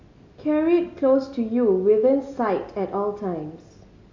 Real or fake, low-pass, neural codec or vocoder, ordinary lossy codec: real; 7.2 kHz; none; AAC, 48 kbps